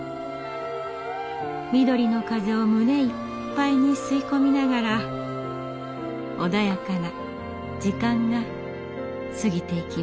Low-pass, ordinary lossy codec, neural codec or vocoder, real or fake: none; none; none; real